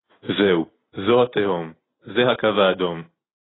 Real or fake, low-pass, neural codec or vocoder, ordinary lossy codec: real; 7.2 kHz; none; AAC, 16 kbps